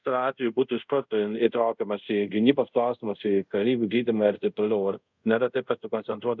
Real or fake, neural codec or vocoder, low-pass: fake; codec, 24 kHz, 0.5 kbps, DualCodec; 7.2 kHz